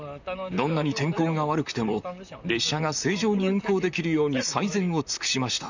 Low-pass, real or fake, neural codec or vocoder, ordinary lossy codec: 7.2 kHz; fake; vocoder, 44.1 kHz, 128 mel bands, Pupu-Vocoder; none